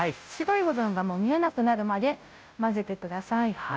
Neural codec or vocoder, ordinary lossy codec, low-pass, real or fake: codec, 16 kHz, 0.5 kbps, FunCodec, trained on Chinese and English, 25 frames a second; none; none; fake